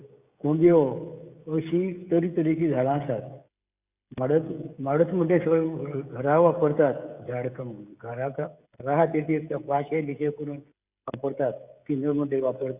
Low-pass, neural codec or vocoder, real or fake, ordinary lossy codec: 3.6 kHz; codec, 16 kHz, 16 kbps, FreqCodec, smaller model; fake; Opus, 64 kbps